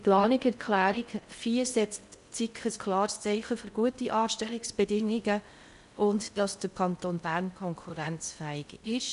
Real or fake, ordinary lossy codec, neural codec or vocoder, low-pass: fake; none; codec, 16 kHz in and 24 kHz out, 0.6 kbps, FocalCodec, streaming, 4096 codes; 10.8 kHz